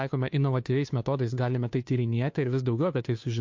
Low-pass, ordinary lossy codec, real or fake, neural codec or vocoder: 7.2 kHz; MP3, 48 kbps; fake; codec, 16 kHz, 2 kbps, FunCodec, trained on Chinese and English, 25 frames a second